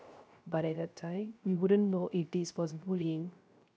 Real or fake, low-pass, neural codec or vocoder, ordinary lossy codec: fake; none; codec, 16 kHz, 0.3 kbps, FocalCodec; none